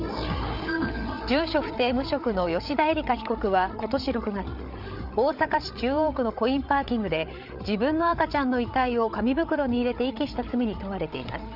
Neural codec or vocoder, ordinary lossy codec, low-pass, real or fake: codec, 16 kHz, 8 kbps, FreqCodec, larger model; none; 5.4 kHz; fake